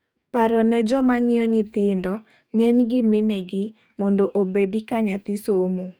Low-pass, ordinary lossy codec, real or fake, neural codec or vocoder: none; none; fake; codec, 44.1 kHz, 2.6 kbps, DAC